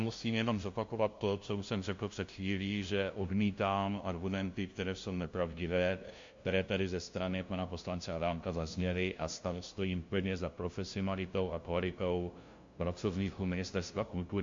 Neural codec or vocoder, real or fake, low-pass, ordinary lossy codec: codec, 16 kHz, 0.5 kbps, FunCodec, trained on LibriTTS, 25 frames a second; fake; 7.2 kHz; MP3, 48 kbps